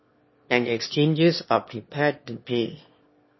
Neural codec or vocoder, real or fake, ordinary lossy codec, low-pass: autoencoder, 22.05 kHz, a latent of 192 numbers a frame, VITS, trained on one speaker; fake; MP3, 24 kbps; 7.2 kHz